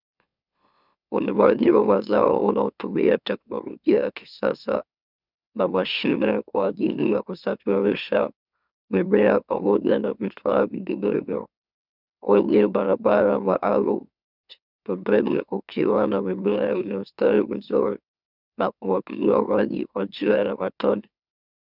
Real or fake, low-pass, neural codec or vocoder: fake; 5.4 kHz; autoencoder, 44.1 kHz, a latent of 192 numbers a frame, MeloTTS